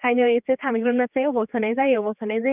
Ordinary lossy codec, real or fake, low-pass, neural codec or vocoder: none; fake; 3.6 kHz; codec, 16 kHz, 4 kbps, X-Codec, HuBERT features, trained on general audio